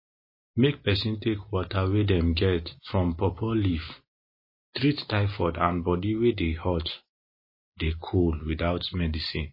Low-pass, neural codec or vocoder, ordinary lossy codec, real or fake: 5.4 kHz; none; MP3, 24 kbps; real